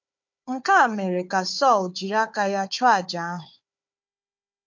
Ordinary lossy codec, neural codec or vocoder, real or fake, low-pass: MP3, 48 kbps; codec, 16 kHz, 4 kbps, FunCodec, trained on Chinese and English, 50 frames a second; fake; 7.2 kHz